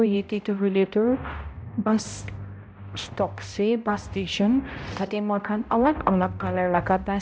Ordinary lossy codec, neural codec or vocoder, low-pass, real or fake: none; codec, 16 kHz, 0.5 kbps, X-Codec, HuBERT features, trained on balanced general audio; none; fake